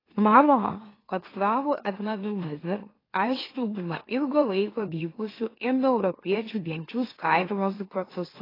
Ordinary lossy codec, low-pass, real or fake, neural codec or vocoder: AAC, 24 kbps; 5.4 kHz; fake; autoencoder, 44.1 kHz, a latent of 192 numbers a frame, MeloTTS